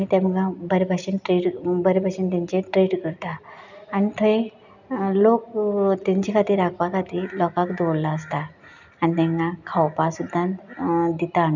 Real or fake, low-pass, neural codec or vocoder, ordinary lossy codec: real; 7.2 kHz; none; none